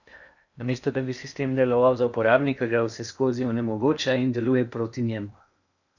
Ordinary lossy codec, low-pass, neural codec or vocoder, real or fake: none; 7.2 kHz; codec, 16 kHz in and 24 kHz out, 0.8 kbps, FocalCodec, streaming, 65536 codes; fake